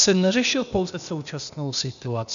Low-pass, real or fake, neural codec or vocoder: 7.2 kHz; fake; codec, 16 kHz, 0.8 kbps, ZipCodec